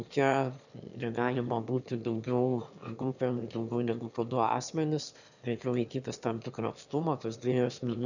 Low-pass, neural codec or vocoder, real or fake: 7.2 kHz; autoencoder, 22.05 kHz, a latent of 192 numbers a frame, VITS, trained on one speaker; fake